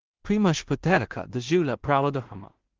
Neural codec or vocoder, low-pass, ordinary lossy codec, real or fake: codec, 16 kHz in and 24 kHz out, 0.4 kbps, LongCat-Audio-Codec, two codebook decoder; 7.2 kHz; Opus, 16 kbps; fake